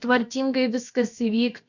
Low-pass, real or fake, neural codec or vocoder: 7.2 kHz; fake; codec, 16 kHz, about 1 kbps, DyCAST, with the encoder's durations